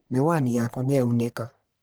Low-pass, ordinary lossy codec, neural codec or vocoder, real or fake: none; none; codec, 44.1 kHz, 1.7 kbps, Pupu-Codec; fake